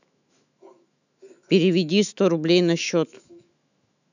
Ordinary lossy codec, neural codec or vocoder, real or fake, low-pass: none; autoencoder, 48 kHz, 128 numbers a frame, DAC-VAE, trained on Japanese speech; fake; 7.2 kHz